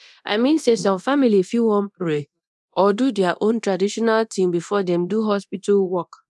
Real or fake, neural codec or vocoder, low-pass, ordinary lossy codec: fake; codec, 24 kHz, 0.9 kbps, DualCodec; none; none